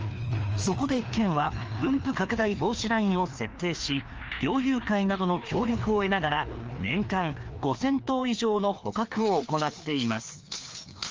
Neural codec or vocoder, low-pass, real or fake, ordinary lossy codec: codec, 16 kHz, 2 kbps, FreqCodec, larger model; 7.2 kHz; fake; Opus, 24 kbps